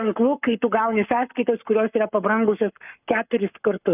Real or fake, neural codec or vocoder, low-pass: fake; codec, 44.1 kHz, 7.8 kbps, Pupu-Codec; 3.6 kHz